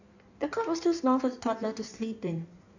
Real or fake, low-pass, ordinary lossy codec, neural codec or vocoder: fake; 7.2 kHz; none; codec, 16 kHz in and 24 kHz out, 1.1 kbps, FireRedTTS-2 codec